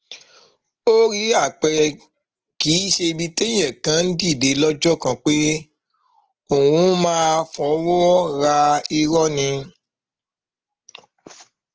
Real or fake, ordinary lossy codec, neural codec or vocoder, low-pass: real; Opus, 16 kbps; none; 7.2 kHz